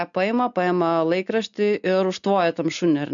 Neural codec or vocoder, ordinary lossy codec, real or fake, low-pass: none; MP3, 96 kbps; real; 7.2 kHz